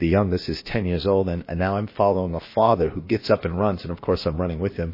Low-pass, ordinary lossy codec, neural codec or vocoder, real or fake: 5.4 kHz; MP3, 24 kbps; codec, 16 kHz, about 1 kbps, DyCAST, with the encoder's durations; fake